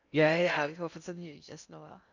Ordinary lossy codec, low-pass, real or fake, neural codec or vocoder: none; 7.2 kHz; fake; codec, 16 kHz in and 24 kHz out, 0.6 kbps, FocalCodec, streaming, 2048 codes